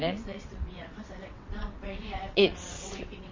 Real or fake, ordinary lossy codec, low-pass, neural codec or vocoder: fake; MP3, 32 kbps; 7.2 kHz; vocoder, 22.05 kHz, 80 mel bands, WaveNeXt